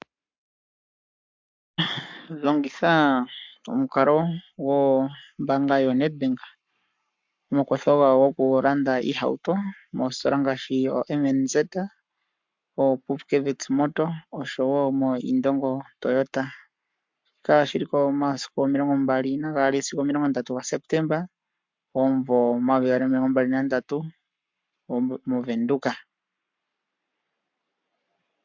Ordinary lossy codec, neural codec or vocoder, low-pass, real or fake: MP3, 64 kbps; codec, 44.1 kHz, 7.8 kbps, Pupu-Codec; 7.2 kHz; fake